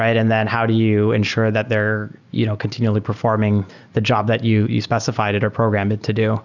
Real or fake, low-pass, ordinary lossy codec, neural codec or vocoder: real; 7.2 kHz; Opus, 64 kbps; none